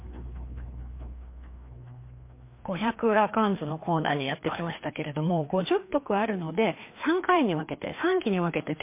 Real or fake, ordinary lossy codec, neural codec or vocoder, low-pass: fake; MP3, 24 kbps; codec, 16 kHz, 2 kbps, FreqCodec, larger model; 3.6 kHz